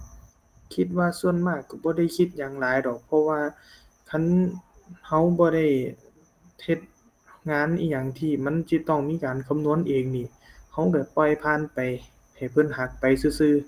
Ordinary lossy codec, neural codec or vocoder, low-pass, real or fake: Opus, 24 kbps; none; 14.4 kHz; real